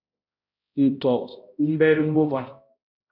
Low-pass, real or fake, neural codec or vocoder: 5.4 kHz; fake; codec, 16 kHz, 0.5 kbps, X-Codec, HuBERT features, trained on balanced general audio